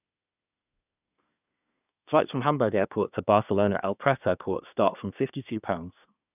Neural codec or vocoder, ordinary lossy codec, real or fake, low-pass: codec, 24 kHz, 1 kbps, SNAC; none; fake; 3.6 kHz